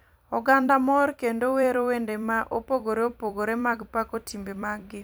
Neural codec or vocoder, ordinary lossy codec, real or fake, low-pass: vocoder, 44.1 kHz, 128 mel bands every 256 samples, BigVGAN v2; none; fake; none